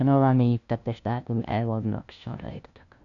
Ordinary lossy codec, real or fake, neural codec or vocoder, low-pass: none; fake; codec, 16 kHz, 0.5 kbps, FunCodec, trained on LibriTTS, 25 frames a second; 7.2 kHz